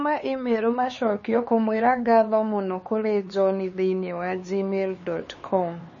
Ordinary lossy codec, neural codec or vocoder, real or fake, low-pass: MP3, 32 kbps; codec, 16 kHz, 4 kbps, X-Codec, HuBERT features, trained on LibriSpeech; fake; 7.2 kHz